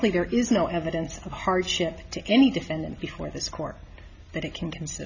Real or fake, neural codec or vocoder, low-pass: real; none; 7.2 kHz